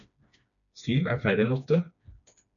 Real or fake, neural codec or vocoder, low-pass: fake; codec, 16 kHz, 2 kbps, FreqCodec, smaller model; 7.2 kHz